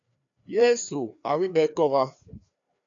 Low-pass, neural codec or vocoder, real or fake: 7.2 kHz; codec, 16 kHz, 2 kbps, FreqCodec, larger model; fake